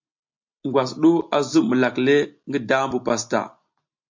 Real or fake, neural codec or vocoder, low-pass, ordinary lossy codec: real; none; 7.2 kHz; MP3, 48 kbps